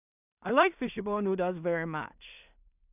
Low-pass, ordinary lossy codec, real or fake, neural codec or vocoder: 3.6 kHz; none; fake; codec, 16 kHz in and 24 kHz out, 0.4 kbps, LongCat-Audio-Codec, two codebook decoder